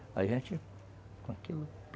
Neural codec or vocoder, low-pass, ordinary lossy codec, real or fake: none; none; none; real